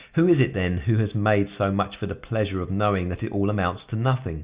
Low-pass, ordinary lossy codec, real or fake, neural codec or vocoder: 3.6 kHz; Opus, 64 kbps; real; none